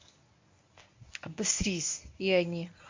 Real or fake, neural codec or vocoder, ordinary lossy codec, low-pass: fake; codec, 24 kHz, 0.9 kbps, WavTokenizer, medium speech release version 1; MP3, 48 kbps; 7.2 kHz